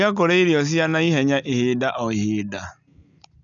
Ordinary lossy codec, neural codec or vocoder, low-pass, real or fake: none; none; 7.2 kHz; real